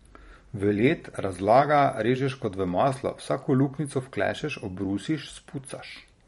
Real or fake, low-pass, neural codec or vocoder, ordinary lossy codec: fake; 19.8 kHz; vocoder, 44.1 kHz, 128 mel bands every 512 samples, BigVGAN v2; MP3, 48 kbps